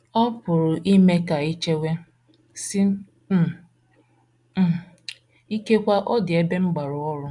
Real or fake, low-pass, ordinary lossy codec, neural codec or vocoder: real; 10.8 kHz; MP3, 96 kbps; none